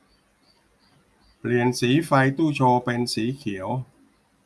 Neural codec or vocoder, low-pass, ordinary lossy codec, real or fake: none; none; none; real